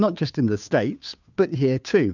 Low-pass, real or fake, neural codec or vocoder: 7.2 kHz; fake; codec, 16 kHz, 2 kbps, FunCodec, trained on Chinese and English, 25 frames a second